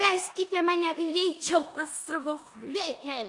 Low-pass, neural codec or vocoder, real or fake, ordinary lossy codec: 10.8 kHz; codec, 16 kHz in and 24 kHz out, 0.9 kbps, LongCat-Audio-Codec, four codebook decoder; fake; AAC, 64 kbps